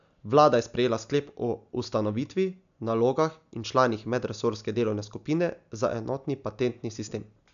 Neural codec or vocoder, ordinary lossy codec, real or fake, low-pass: none; none; real; 7.2 kHz